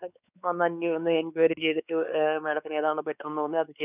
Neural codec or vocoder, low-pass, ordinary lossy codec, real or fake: codec, 16 kHz, 4 kbps, X-Codec, WavLM features, trained on Multilingual LibriSpeech; 3.6 kHz; none; fake